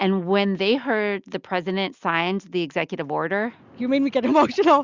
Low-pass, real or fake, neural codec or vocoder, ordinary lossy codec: 7.2 kHz; real; none; Opus, 64 kbps